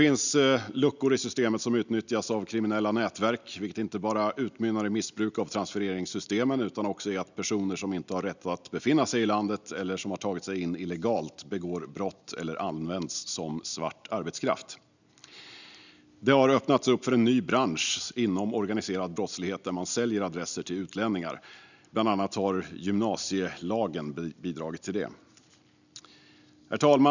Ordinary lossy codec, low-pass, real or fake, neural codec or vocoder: none; 7.2 kHz; real; none